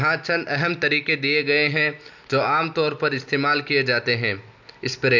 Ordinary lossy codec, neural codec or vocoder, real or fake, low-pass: none; none; real; 7.2 kHz